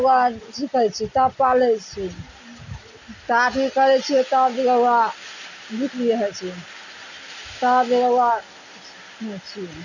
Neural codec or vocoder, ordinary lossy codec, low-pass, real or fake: none; none; 7.2 kHz; real